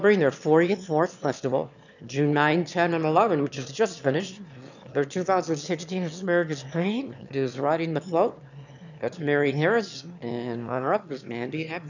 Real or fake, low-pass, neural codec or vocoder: fake; 7.2 kHz; autoencoder, 22.05 kHz, a latent of 192 numbers a frame, VITS, trained on one speaker